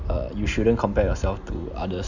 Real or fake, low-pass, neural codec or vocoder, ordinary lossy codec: real; 7.2 kHz; none; none